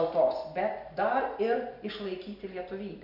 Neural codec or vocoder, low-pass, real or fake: none; 5.4 kHz; real